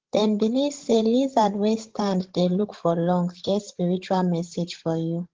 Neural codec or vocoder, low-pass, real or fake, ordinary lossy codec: codec, 16 kHz, 16 kbps, FreqCodec, larger model; 7.2 kHz; fake; Opus, 16 kbps